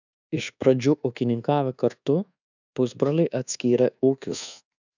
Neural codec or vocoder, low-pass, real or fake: codec, 24 kHz, 1.2 kbps, DualCodec; 7.2 kHz; fake